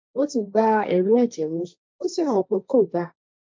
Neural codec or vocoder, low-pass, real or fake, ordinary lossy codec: codec, 16 kHz, 1.1 kbps, Voila-Tokenizer; none; fake; none